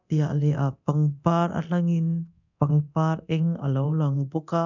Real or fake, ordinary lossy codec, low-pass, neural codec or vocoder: fake; none; 7.2 kHz; codec, 24 kHz, 0.9 kbps, DualCodec